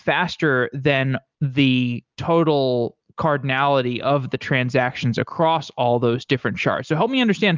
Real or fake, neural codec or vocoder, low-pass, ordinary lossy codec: real; none; 7.2 kHz; Opus, 24 kbps